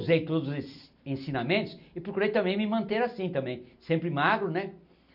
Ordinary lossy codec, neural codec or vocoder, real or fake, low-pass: none; none; real; 5.4 kHz